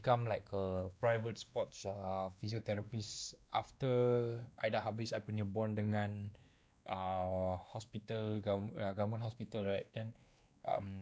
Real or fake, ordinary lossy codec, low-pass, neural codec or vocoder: fake; none; none; codec, 16 kHz, 2 kbps, X-Codec, WavLM features, trained on Multilingual LibriSpeech